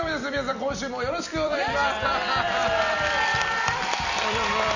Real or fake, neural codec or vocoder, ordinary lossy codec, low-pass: fake; vocoder, 44.1 kHz, 128 mel bands every 256 samples, BigVGAN v2; none; 7.2 kHz